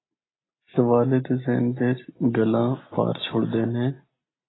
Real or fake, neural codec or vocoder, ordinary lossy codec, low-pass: real; none; AAC, 16 kbps; 7.2 kHz